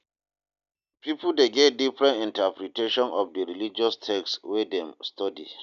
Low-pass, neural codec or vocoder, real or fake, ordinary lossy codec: 7.2 kHz; none; real; none